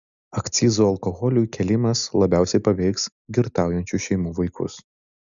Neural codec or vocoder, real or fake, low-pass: none; real; 7.2 kHz